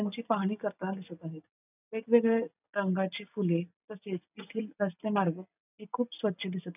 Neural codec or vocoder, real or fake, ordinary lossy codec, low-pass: none; real; none; 3.6 kHz